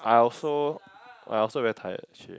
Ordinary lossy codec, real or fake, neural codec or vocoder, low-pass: none; real; none; none